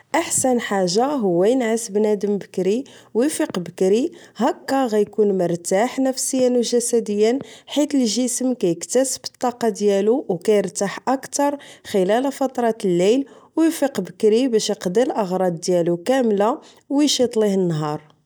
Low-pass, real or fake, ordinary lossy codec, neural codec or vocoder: none; real; none; none